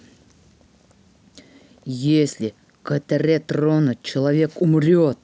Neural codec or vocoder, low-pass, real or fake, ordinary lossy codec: none; none; real; none